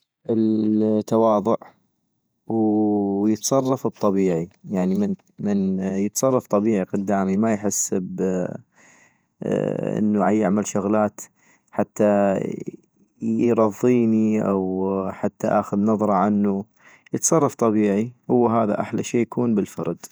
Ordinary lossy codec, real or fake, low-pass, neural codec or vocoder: none; fake; none; vocoder, 48 kHz, 128 mel bands, Vocos